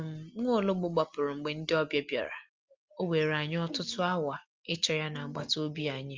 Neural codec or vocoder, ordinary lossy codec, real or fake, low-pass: none; Opus, 32 kbps; real; 7.2 kHz